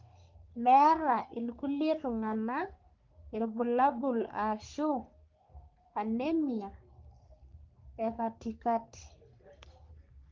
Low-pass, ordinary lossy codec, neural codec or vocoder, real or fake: 7.2 kHz; Opus, 24 kbps; codec, 44.1 kHz, 3.4 kbps, Pupu-Codec; fake